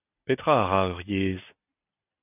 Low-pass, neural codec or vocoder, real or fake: 3.6 kHz; vocoder, 44.1 kHz, 128 mel bands every 256 samples, BigVGAN v2; fake